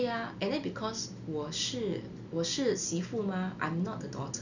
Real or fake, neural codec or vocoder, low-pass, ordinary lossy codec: real; none; 7.2 kHz; none